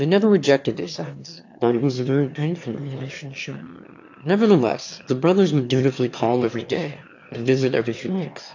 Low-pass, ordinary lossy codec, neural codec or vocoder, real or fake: 7.2 kHz; AAC, 48 kbps; autoencoder, 22.05 kHz, a latent of 192 numbers a frame, VITS, trained on one speaker; fake